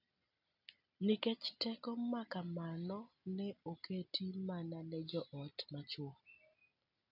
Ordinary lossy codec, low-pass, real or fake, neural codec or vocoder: none; 5.4 kHz; real; none